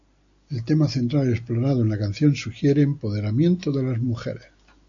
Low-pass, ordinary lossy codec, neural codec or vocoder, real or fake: 7.2 kHz; AAC, 64 kbps; none; real